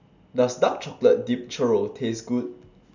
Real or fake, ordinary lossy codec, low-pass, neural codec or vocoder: real; none; 7.2 kHz; none